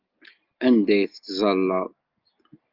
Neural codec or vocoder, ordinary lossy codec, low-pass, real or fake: none; Opus, 24 kbps; 5.4 kHz; real